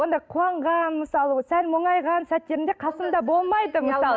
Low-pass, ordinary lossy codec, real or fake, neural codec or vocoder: none; none; real; none